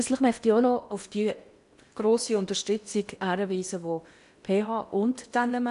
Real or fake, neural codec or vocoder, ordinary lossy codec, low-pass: fake; codec, 16 kHz in and 24 kHz out, 0.8 kbps, FocalCodec, streaming, 65536 codes; none; 10.8 kHz